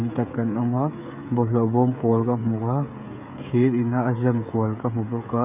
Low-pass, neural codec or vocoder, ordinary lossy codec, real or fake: 3.6 kHz; codec, 16 kHz, 16 kbps, FreqCodec, smaller model; none; fake